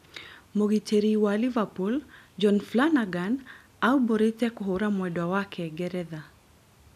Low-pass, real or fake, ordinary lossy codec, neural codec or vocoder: 14.4 kHz; real; none; none